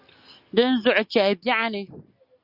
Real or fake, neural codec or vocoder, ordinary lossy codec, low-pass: real; none; Opus, 64 kbps; 5.4 kHz